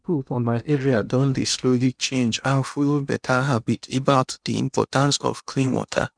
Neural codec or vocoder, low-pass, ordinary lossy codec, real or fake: codec, 16 kHz in and 24 kHz out, 0.8 kbps, FocalCodec, streaming, 65536 codes; 9.9 kHz; none; fake